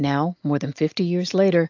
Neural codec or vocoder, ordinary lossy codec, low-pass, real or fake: none; AAC, 48 kbps; 7.2 kHz; real